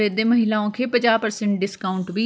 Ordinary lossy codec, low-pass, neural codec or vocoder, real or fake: none; none; none; real